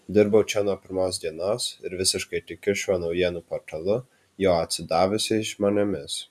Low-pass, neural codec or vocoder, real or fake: 14.4 kHz; none; real